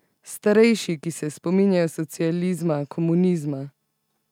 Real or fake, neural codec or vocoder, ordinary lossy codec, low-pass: real; none; none; 19.8 kHz